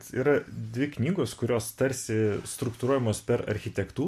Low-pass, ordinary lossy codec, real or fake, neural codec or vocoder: 14.4 kHz; MP3, 64 kbps; real; none